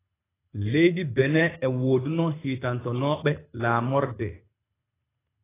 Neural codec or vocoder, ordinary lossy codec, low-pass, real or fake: codec, 24 kHz, 6 kbps, HILCodec; AAC, 16 kbps; 3.6 kHz; fake